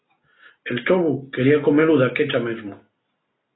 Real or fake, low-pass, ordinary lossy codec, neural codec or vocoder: real; 7.2 kHz; AAC, 16 kbps; none